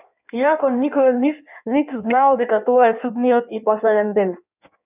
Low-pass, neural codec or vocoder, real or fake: 3.6 kHz; codec, 16 kHz in and 24 kHz out, 1.1 kbps, FireRedTTS-2 codec; fake